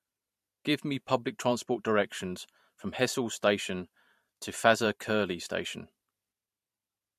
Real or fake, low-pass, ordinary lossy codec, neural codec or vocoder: fake; 14.4 kHz; MP3, 64 kbps; vocoder, 44.1 kHz, 128 mel bands every 512 samples, BigVGAN v2